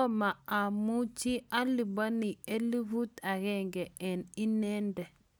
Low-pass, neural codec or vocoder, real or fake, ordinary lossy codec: none; none; real; none